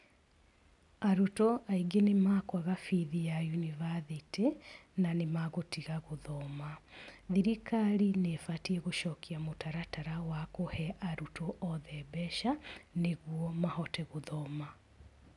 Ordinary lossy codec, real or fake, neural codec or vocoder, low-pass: none; real; none; 10.8 kHz